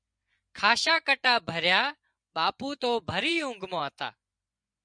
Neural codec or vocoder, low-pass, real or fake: vocoder, 24 kHz, 100 mel bands, Vocos; 9.9 kHz; fake